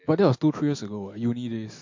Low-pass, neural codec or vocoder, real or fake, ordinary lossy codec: 7.2 kHz; none; real; MP3, 48 kbps